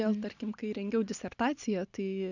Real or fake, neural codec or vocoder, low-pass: real; none; 7.2 kHz